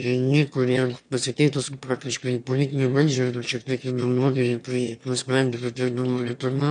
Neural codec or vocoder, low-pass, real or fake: autoencoder, 22.05 kHz, a latent of 192 numbers a frame, VITS, trained on one speaker; 9.9 kHz; fake